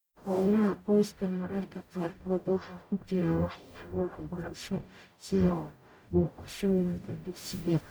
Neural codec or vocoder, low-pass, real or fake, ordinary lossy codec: codec, 44.1 kHz, 0.9 kbps, DAC; none; fake; none